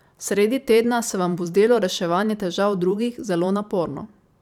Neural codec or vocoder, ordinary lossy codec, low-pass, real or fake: vocoder, 44.1 kHz, 128 mel bands every 512 samples, BigVGAN v2; none; 19.8 kHz; fake